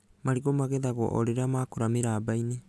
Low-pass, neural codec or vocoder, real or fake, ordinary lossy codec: none; none; real; none